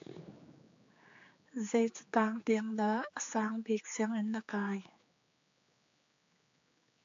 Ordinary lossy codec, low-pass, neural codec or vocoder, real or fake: AAC, 48 kbps; 7.2 kHz; codec, 16 kHz, 4 kbps, X-Codec, HuBERT features, trained on general audio; fake